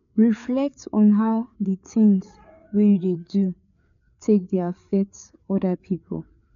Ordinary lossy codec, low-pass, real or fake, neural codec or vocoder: none; 7.2 kHz; fake; codec, 16 kHz, 4 kbps, FreqCodec, larger model